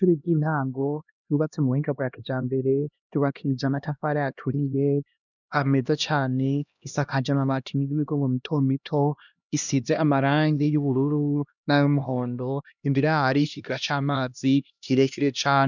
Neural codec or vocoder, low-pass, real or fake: codec, 16 kHz, 1 kbps, X-Codec, HuBERT features, trained on LibriSpeech; 7.2 kHz; fake